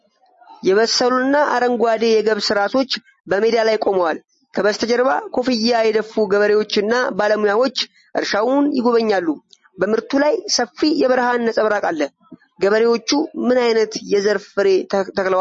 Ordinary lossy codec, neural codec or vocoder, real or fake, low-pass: MP3, 32 kbps; none; real; 10.8 kHz